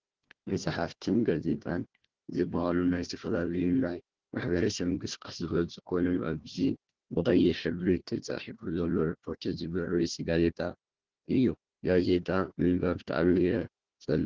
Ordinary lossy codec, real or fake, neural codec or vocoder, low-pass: Opus, 16 kbps; fake; codec, 16 kHz, 1 kbps, FunCodec, trained on Chinese and English, 50 frames a second; 7.2 kHz